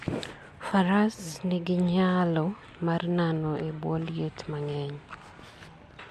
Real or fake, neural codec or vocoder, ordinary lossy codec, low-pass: real; none; MP3, 64 kbps; 14.4 kHz